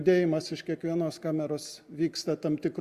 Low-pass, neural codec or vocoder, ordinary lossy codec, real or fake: 14.4 kHz; none; Opus, 64 kbps; real